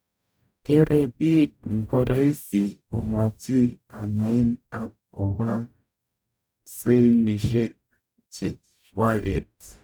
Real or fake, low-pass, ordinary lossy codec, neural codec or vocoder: fake; none; none; codec, 44.1 kHz, 0.9 kbps, DAC